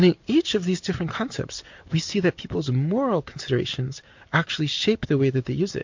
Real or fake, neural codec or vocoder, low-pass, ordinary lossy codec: real; none; 7.2 kHz; MP3, 48 kbps